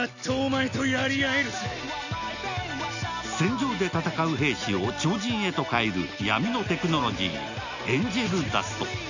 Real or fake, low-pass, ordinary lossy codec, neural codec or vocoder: real; 7.2 kHz; none; none